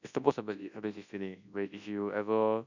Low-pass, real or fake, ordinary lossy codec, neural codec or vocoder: 7.2 kHz; fake; none; codec, 24 kHz, 0.9 kbps, WavTokenizer, large speech release